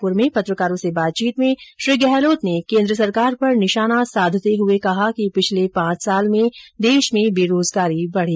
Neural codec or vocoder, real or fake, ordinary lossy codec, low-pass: none; real; none; none